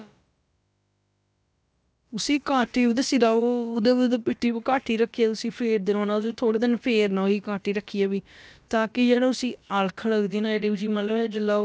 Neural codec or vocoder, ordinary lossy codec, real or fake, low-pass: codec, 16 kHz, about 1 kbps, DyCAST, with the encoder's durations; none; fake; none